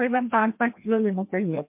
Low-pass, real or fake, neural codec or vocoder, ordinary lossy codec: 3.6 kHz; fake; codec, 16 kHz, 1 kbps, FreqCodec, larger model; MP3, 32 kbps